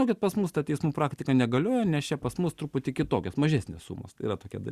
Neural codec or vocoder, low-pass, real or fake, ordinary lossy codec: none; 14.4 kHz; real; Opus, 64 kbps